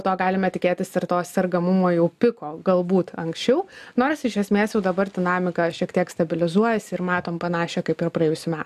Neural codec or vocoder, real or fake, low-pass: none; real; 14.4 kHz